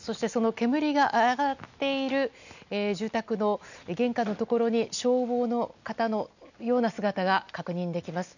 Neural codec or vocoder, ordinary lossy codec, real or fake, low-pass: none; none; real; 7.2 kHz